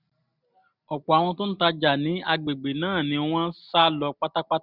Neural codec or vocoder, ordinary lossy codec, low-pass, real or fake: none; none; 5.4 kHz; real